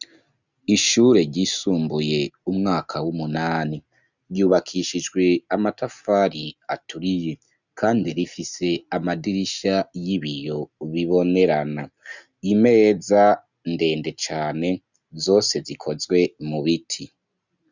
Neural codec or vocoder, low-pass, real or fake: none; 7.2 kHz; real